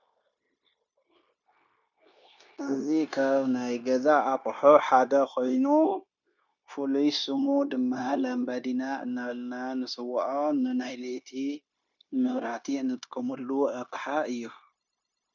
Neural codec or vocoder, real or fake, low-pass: codec, 16 kHz, 0.9 kbps, LongCat-Audio-Codec; fake; 7.2 kHz